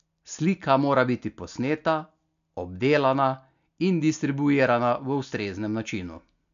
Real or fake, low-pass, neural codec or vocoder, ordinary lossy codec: real; 7.2 kHz; none; none